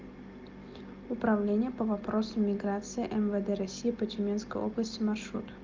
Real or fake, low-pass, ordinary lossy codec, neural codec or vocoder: real; 7.2 kHz; Opus, 24 kbps; none